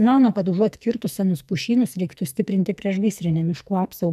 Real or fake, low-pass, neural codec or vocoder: fake; 14.4 kHz; codec, 44.1 kHz, 2.6 kbps, SNAC